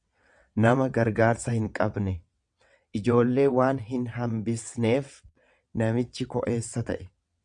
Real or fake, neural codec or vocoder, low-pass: fake; vocoder, 22.05 kHz, 80 mel bands, WaveNeXt; 9.9 kHz